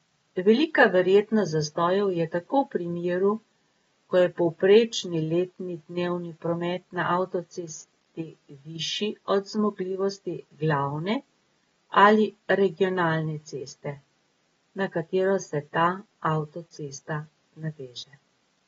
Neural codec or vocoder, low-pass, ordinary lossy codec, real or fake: none; 19.8 kHz; AAC, 24 kbps; real